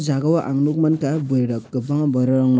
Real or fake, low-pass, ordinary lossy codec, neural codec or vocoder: real; none; none; none